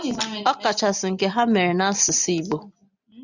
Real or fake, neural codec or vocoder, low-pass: real; none; 7.2 kHz